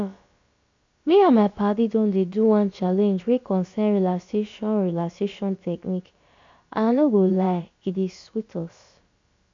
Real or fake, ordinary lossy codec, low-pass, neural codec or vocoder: fake; AAC, 48 kbps; 7.2 kHz; codec, 16 kHz, about 1 kbps, DyCAST, with the encoder's durations